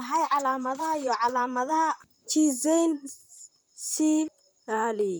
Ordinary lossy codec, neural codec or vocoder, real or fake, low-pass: none; vocoder, 44.1 kHz, 128 mel bands, Pupu-Vocoder; fake; none